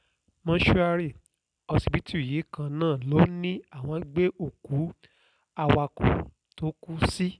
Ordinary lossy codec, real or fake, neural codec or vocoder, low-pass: none; real; none; 9.9 kHz